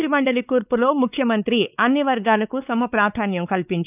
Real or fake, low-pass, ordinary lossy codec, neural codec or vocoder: fake; 3.6 kHz; none; codec, 16 kHz, 4 kbps, X-Codec, WavLM features, trained on Multilingual LibriSpeech